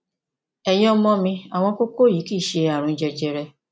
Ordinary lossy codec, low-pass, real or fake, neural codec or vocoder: none; none; real; none